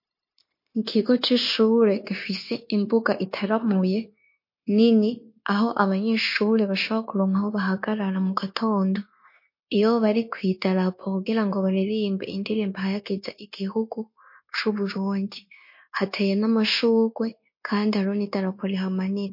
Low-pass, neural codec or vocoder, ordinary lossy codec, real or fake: 5.4 kHz; codec, 16 kHz, 0.9 kbps, LongCat-Audio-Codec; MP3, 32 kbps; fake